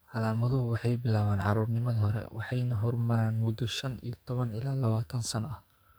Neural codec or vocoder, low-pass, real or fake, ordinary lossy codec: codec, 44.1 kHz, 2.6 kbps, SNAC; none; fake; none